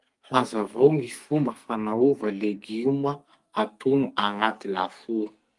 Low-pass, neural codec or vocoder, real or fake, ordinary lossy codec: 10.8 kHz; codec, 44.1 kHz, 2.6 kbps, SNAC; fake; Opus, 32 kbps